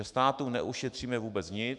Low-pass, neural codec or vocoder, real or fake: 10.8 kHz; autoencoder, 48 kHz, 128 numbers a frame, DAC-VAE, trained on Japanese speech; fake